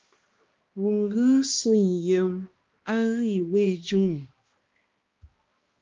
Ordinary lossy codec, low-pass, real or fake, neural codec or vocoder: Opus, 32 kbps; 7.2 kHz; fake; codec, 16 kHz, 1 kbps, X-Codec, HuBERT features, trained on balanced general audio